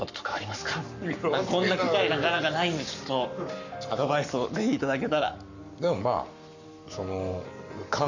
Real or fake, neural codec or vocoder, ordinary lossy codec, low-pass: fake; codec, 44.1 kHz, 7.8 kbps, Pupu-Codec; none; 7.2 kHz